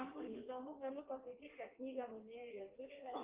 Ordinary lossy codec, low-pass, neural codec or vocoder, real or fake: Opus, 32 kbps; 3.6 kHz; codec, 16 kHz in and 24 kHz out, 1.1 kbps, FireRedTTS-2 codec; fake